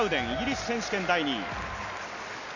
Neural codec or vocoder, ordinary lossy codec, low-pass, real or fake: none; none; 7.2 kHz; real